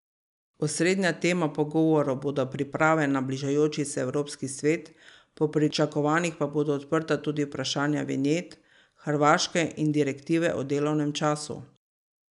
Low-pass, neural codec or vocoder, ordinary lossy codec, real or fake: 10.8 kHz; none; none; real